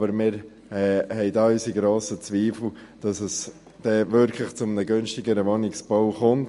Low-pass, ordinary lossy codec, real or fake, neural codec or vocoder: 14.4 kHz; MP3, 48 kbps; real; none